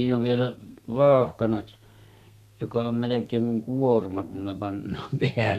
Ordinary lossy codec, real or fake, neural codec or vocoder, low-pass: none; fake; codec, 32 kHz, 1.9 kbps, SNAC; 14.4 kHz